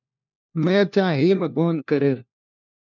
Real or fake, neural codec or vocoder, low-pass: fake; codec, 16 kHz, 1 kbps, FunCodec, trained on LibriTTS, 50 frames a second; 7.2 kHz